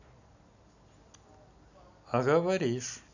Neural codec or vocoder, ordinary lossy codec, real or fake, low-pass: none; none; real; 7.2 kHz